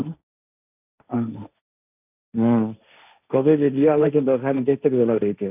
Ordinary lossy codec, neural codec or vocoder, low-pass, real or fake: none; codec, 16 kHz, 1.1 kbps, Voila-Tokenizer; 3.6 kHz; fake